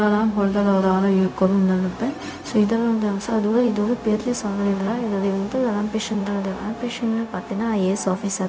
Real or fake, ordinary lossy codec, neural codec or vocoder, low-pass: fake; none; codec, 16 kHz, 0.4 kbps, LongCat-Audio-Codec; none